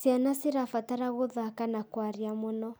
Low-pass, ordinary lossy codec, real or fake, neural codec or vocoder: none; none; real; none